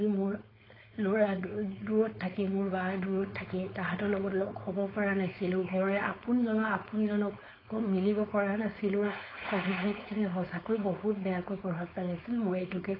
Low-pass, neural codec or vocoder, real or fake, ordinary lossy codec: 5.4 kHz; codec, 16 kHz, 4.8 kbps, FACodec; fake; AAC, 24 kbps